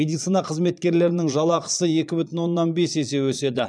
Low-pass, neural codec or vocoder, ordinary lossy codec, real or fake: 9.9 kHz; vocoder, 22.05 kHz, 80 mel bands, Vocos; none; fake